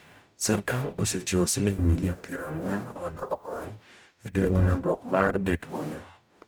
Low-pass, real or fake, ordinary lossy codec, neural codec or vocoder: none; fake; none; codec, 44.1 kHz, 0.9 kbps, DAC